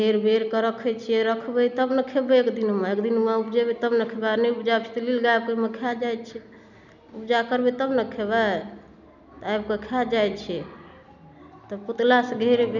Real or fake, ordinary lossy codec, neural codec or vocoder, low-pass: real; none; none; 7.2 kHz